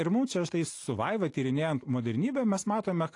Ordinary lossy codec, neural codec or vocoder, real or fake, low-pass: AAC, 48 kbps; none; real; 10.8 kHz